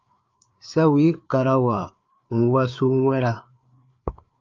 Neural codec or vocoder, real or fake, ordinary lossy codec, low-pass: codec, 16 kHz, 4 kbps, FreqCodec, larger model; fake; Opus, 24 kbps; 7.2 kHz